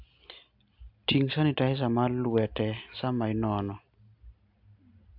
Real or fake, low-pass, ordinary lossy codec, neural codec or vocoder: real; 5.4 kHz; AAC, 48 kbps; none